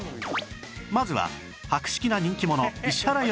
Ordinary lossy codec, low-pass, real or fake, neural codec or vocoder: none; none; real; none